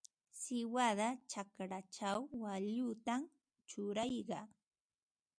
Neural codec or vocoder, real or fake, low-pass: none; real; 9.9 kHz